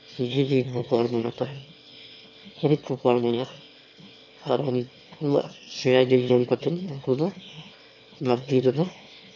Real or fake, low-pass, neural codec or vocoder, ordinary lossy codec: fake; 7.2 kHz; autoencoder, 22.05 kHz, a latent of 192 numbers a frame, VITS, trained on one speaker; MP3, 64 kbps